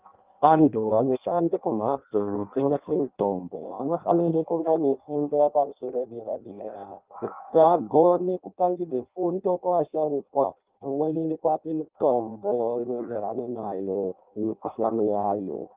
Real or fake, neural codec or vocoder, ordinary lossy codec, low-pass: fake; codec, 16 kHz in and 24 kHz out, 0.6 kbps, FireRedTTS-2 codec; Opus, 32 kbps; 3.6 kHz